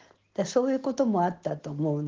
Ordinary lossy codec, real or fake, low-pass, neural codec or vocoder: Opus, 16 kbps; real; 7.2 kHz; none